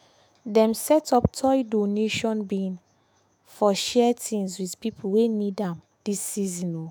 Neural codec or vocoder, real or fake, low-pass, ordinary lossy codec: autoencoder, 48 kHz, 128 numbers a frame, DAC-VAE, trained on Japanese speech; fake; none; none